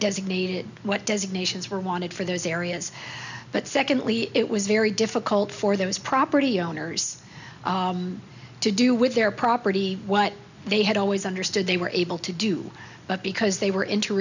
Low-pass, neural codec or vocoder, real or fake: 7.2 kHz; none; real